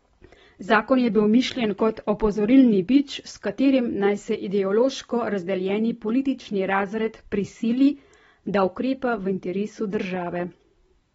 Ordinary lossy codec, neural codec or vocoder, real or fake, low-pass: AAC, 24 kbps; none; real; 19.8 kHz